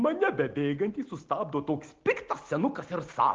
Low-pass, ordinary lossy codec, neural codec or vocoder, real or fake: 7.2 kHz; Opus, 16 kbps; none; real